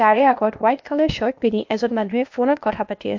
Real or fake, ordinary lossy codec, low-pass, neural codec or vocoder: fake; MP3, 48 kbps; 7.2 kHz; codec, 16 kHz, 0.8 kbps, ZipCodec